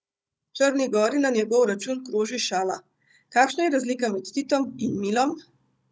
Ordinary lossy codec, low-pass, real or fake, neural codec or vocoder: none; none; fake; codec, 16 kHz, 16 kbps, FunCodec, trained on Chinese and English, 50 frames a second